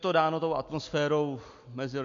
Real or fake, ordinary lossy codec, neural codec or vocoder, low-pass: real; MP3, 48 kbps; none; 7.2 kHz